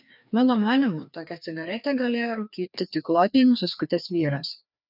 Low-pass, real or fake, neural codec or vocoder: 5.4 kHz; fake; codec, 16 kHz, 2 kbps, FreqCodec, larger model